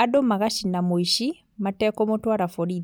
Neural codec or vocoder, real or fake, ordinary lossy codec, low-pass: none; real; none; none